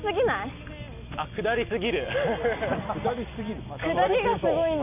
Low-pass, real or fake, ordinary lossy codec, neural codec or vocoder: 3.6 kHz; real; none; none